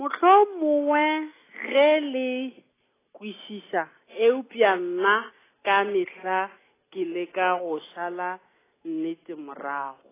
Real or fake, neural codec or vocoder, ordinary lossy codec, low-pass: real; none; AAC, 16 kbps; 3.6 kHz